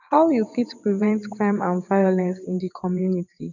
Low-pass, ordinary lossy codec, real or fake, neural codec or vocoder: 7.2 kHz; none; fake; vocoder, 22.05 kHz, 80 mel bands, WaveNeXt